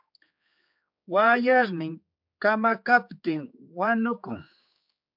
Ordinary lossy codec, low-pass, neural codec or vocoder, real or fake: MP3, 48 kbps; 5.4 kHz; codec, 16 kHz, 4 kbps, X-Codec, HuBERT features, trained on general audio; fake